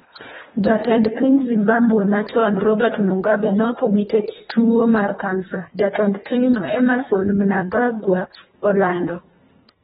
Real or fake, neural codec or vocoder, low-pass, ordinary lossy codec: fake; codec, 24 kHz, 1.5 kbps, HILCodec; 10.8 kHz; AAC, 16 kbps